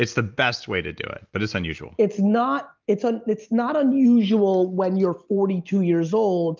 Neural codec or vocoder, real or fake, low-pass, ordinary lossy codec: none; real; 7.2 kHz; Opus, 24 kbps